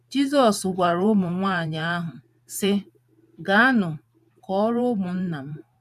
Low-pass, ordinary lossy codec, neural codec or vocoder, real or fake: 14.4 kHz; none; vocoder, 44.1 kHz, 128 mel bands every 512 samples, BigVGAN v2; fake